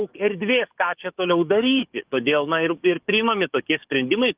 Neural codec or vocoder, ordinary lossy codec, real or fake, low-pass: codec, 44.1 kHz, 7.8 kbps, DAC; Opus, 32 kbps; fake; 3.6 kHz